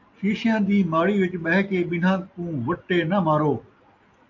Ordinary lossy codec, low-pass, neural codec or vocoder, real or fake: AAC, 48 kbps; 7.2 kHz; none; real